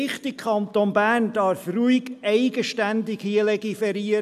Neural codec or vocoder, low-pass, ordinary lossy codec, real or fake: none; 14.4 kHz; AAC, 96 kbps; real